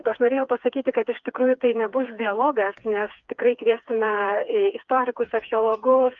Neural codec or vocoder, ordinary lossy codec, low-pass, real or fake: codec, 16 kHz, 4 kbps, FreqCodec, smaller model; Opus, 32 kbps; 7.2 kHz; fake